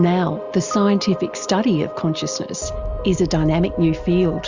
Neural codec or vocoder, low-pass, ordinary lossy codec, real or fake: none; 7.2 kHz; Opus, 64 kbps; real